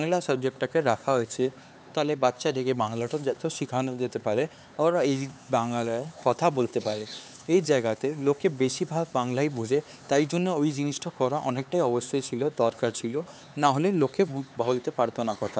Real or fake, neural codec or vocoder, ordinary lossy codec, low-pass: fake; codec, 16 kHz, 4 kbps, X-Codec, HuBERT features, trained on LibriSpeech; none; none